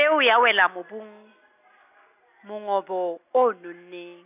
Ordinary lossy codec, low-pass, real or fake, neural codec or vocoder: none; 3.6 kHz; real; none